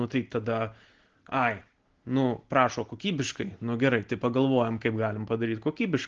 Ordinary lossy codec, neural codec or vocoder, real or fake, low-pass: Opus, 16 kbps; none; real; 7.2 kHz